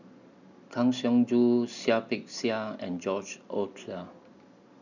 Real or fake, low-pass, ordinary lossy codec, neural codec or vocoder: real; 7.2 kHz; none; none